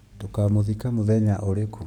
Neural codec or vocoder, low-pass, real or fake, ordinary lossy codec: codec, 44.1 kHz, 7.8 kbps, DAC; 19.8 kHz; fake; none